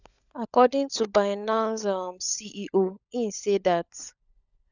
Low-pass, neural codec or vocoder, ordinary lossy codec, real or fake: 7.2 kHz; codec, 16 kHz, 16 kbps, FunCodec, trained on LibriTTS, 50 frames a second; none; fake